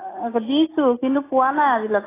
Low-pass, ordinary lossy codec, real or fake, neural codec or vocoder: 3.6 kHz; AAC, 16 kbps; real; none